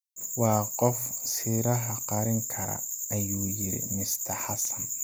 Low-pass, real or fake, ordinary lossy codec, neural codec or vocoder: none; real; none; none